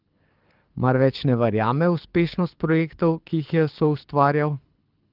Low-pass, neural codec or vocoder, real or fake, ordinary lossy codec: 5.4 kHz; codec, 24 kHz, 6 kbps, HILCodec; fake; Opus, 32 kbps